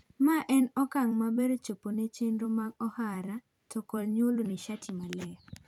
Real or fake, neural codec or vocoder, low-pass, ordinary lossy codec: fake; vocoder, 44.1 kHz, 128 mel bands every 256 samples, BigVGAN v2; 19.8 kHz; none